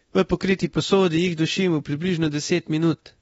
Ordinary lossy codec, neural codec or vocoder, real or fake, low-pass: AAC, 24 kbps; codec, 24 kHz, 0.9 kbps, DualCodec; fake; 10.8 kHz